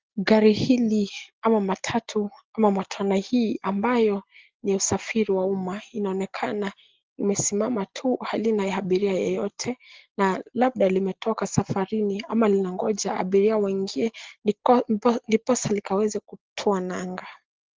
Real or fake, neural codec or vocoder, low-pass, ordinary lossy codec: real; none; 7.2 kHz; Opus, 16 kbps